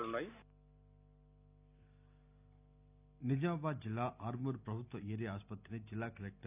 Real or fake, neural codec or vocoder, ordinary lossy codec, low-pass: real; none; none; 3.6 kHz